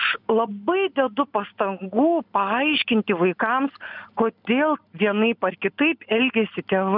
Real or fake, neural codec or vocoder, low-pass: real; none; 5.4 kHz